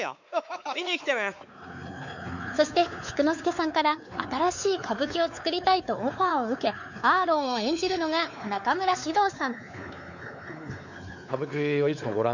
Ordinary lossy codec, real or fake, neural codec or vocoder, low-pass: none; fake; codec, 16 kHz, 4 kbps, X-Codec, WavLM features, trained on Multilingual LibriSpeech; 7.2 kHz